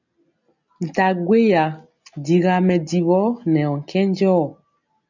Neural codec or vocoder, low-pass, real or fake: none; 7.2 kHz; real